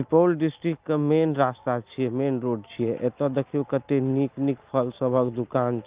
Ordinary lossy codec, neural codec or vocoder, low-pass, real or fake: Opus, 32 kbps; none; 3.6 kHz; real